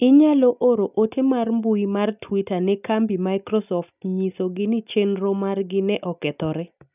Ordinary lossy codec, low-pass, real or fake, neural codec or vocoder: none; 3.6 kHz; real; none